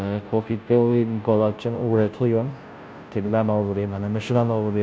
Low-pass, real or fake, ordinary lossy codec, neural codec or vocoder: none; fake; none; codec, 16 kHz, 0.5 kbps, FunCodec, trained on Chinese and English, 25 frames a second